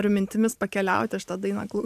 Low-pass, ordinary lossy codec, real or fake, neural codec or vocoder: 14.4 kHz; AAC, 96 kbps; fake; vocoder, 44.1 kHz, 128 mel bands every 512 samples, BigVGAN v2